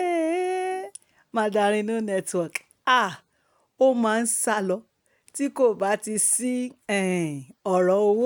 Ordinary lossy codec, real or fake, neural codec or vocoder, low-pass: none; real; none; none